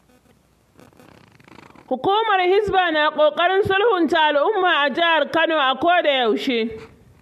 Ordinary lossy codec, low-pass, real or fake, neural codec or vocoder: MP3, 64 kbps; 14.4 kHz; real; none